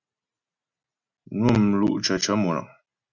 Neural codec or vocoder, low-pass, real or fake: none; 7.2 kHz; real